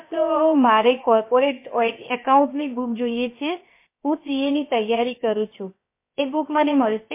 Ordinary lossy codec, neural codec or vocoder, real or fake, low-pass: MP3, 24 kbps; codec, 16 kHz, about 1 kbps, DyCAST, with the encoder's durations; fake; 3.6 kHz